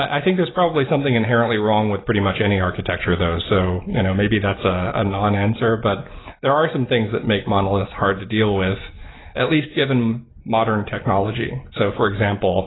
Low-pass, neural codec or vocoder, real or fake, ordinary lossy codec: 7.2 kHz; none; real; AAC, 16 kbps